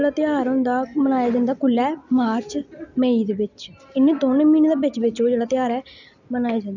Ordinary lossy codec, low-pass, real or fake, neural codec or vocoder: none; 7.2 kHz; real; none